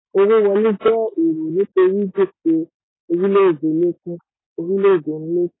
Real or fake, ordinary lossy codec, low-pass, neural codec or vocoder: real; AAC, 16 kbps; 7.2 kHz; none